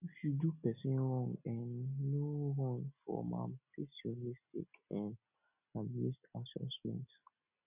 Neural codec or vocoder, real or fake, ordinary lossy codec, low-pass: none; real; none; 3.6 kHz